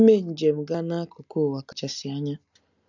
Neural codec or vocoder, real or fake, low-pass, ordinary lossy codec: none; real; 7.2 kHz; none